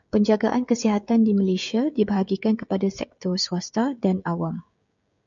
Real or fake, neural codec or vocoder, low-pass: fake; codec, 16 kHz, 16 kbps, FreqCodec, smaller model; 7.2 kHz